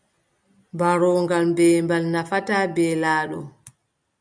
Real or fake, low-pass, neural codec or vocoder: real; 9.9 kHz; none